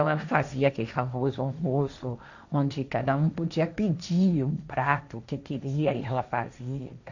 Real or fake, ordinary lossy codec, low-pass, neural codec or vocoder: fake; none; 7.2 kHz; codec, 16 kHz, 1.1 kbps, Voila-Tokenizer